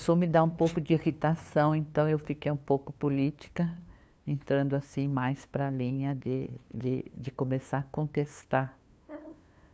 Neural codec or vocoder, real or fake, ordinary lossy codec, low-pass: codec, 16 kHz, 2 kbps, FunCodec, trained on LibriTTS, 25 frames a second; fake; none; none